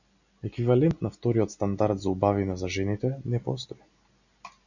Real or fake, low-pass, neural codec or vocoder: real; 7.2 kHz; none